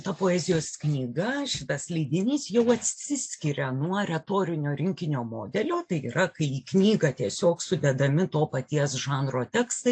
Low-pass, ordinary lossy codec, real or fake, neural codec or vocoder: 9.9 kHz; AAC, 64 kbps; real; none